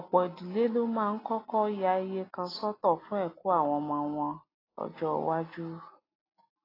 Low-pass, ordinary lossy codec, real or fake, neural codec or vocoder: 5.4 kHz; AAC, 24 kbps; real; none